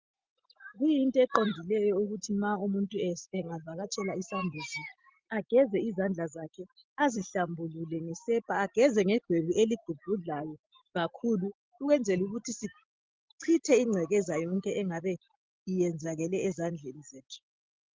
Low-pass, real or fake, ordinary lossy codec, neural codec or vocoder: 7.2 kHz; real; Opus, 32 kbps; none